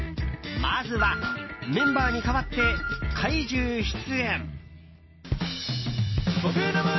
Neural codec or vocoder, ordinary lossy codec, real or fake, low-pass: none; MP3, 24 kbps; real; 7.2 kHz